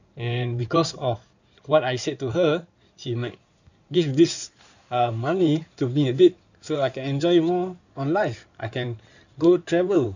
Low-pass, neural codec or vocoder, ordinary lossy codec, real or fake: 7.2 kHz; codec, 16 kHz in and 24 kHz out, 2.2 kbps, FireRedTTS-2 codec; none; fake